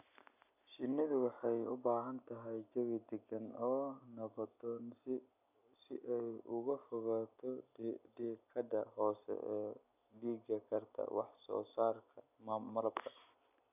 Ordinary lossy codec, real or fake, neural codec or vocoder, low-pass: none; real; none; 3.6 kHz